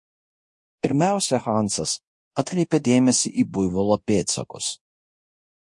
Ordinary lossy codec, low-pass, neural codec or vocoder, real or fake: MP3, 48 kbps; 10.8 kHz; codec, 24 kHz, 0.9 kbps, DualCodec; fake